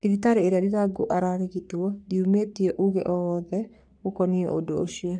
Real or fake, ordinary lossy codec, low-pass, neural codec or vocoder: fake; none; 9.9 kHz; codec, 44.1 kHz, 3.4 kbps, Pupu-Codec